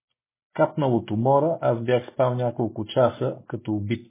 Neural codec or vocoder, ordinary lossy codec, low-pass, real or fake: none; MP3, 16 kbps; 3.6 kHz; real